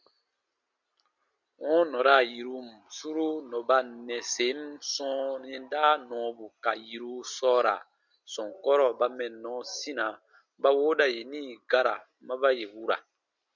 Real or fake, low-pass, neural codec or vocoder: real; 7.2 kHz; none